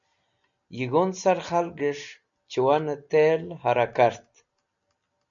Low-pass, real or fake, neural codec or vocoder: 7.2 kHz; real; none